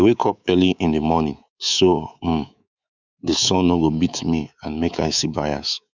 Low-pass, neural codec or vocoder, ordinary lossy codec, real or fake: 7.2 kHz; codec, 24 kHz, 3.1 kbps, DualCodec; none; fake